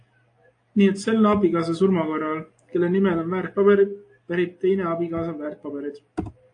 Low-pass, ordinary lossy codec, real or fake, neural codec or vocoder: 9.9 kHz; AAC, 48 kbps; real; none